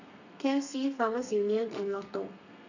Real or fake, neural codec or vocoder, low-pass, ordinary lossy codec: fake; codec, 32 kHz, 1.9 kbps, SNAC; 7.2 kHz; none